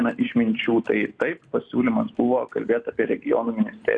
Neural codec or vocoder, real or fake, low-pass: none; real; 9.9 kHz